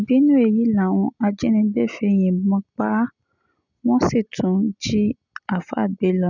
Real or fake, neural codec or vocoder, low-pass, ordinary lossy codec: real; none; 7.2 kHz; none